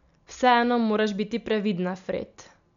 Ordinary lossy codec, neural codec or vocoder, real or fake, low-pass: MP3, 96 kbps; none; real; 7.2 kHz